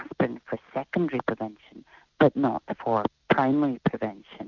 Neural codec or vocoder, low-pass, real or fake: none; 7.2 kHz; real